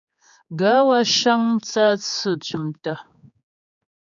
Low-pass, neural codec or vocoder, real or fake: 7.2 kHz; codec, 16 kHz, 4 kbps, X-Codec, HuBERT features, trained on general audio; fake